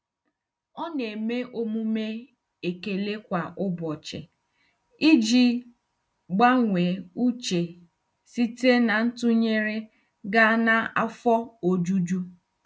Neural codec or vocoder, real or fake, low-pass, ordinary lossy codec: none; real; none; none